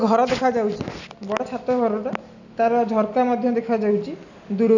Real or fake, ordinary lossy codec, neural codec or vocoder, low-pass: real; none; none; 7.2 kHz